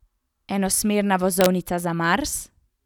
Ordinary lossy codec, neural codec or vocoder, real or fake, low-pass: none; none; real; 19.8 kHz